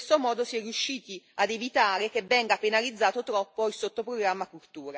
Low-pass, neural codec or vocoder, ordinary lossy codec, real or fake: none; none; none; real